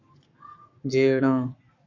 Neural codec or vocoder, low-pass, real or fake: codec, 44.1 kHz, 7.8 kbps, Pupu-Codec; 7.2 kHz; fake